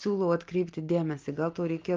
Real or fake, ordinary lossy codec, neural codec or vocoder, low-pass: real; Opus, 32 kbps; none; 7.2 kHz